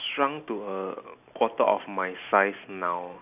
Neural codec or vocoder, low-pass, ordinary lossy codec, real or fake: none; 3.6 kHz; none; real